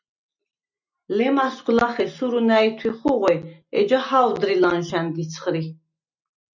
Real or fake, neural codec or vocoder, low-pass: real; none; 7.2 kHz